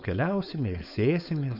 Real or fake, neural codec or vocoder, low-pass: fake; codec, 16 kHz, 4.8 kbps, FACodec; 5.4 kHz